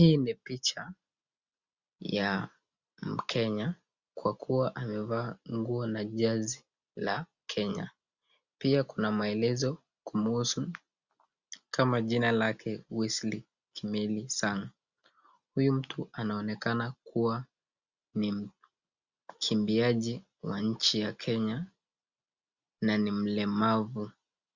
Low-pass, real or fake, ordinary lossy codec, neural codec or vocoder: 7.2 kHz; real; Opus, 64 kbps; none